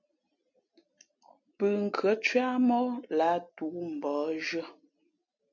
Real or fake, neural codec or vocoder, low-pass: real; none; 7.2 kHz